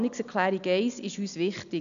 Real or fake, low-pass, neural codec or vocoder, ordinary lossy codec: real; 7.2 kHz; none; none